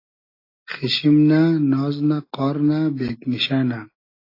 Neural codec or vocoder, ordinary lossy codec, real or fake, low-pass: none; AAC, 32 kbps; real; 5.4 kHz